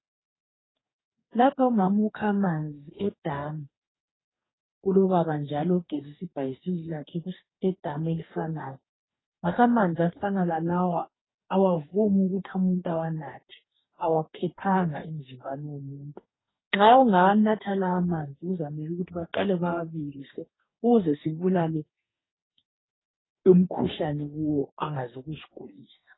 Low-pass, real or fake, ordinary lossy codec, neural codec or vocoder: 7.2 kHz; fake; AAC, 16 kbps; codec, 44.1 kHz, 2.6 kbps, DAC